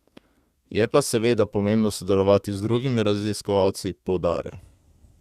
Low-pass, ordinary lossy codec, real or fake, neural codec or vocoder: 14.4 kHz; Opus, 64 kbps; fake; codec, 32 kHz, 1.9 kbps, SNAC